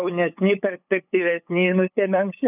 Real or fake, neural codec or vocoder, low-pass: fake; codec, 16 kHz, 8 kbps, FunCodec, trained on LibriTTS, 25 frames a second; 3.6 kHz